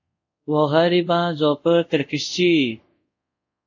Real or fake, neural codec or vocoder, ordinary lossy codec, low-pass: fake; codec, 24 kHz, 0.5 kbps, DualCodec; AAC, 48 kbps; 7.2 kHz